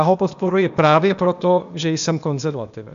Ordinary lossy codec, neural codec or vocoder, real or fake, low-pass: AAC, 96 kbps; codec, 16 kHz, 0.8 kbps, ZipCodec; fake; 7.2 kHz